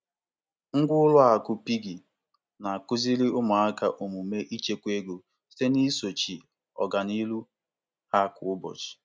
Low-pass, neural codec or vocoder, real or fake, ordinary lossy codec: none; none; real; none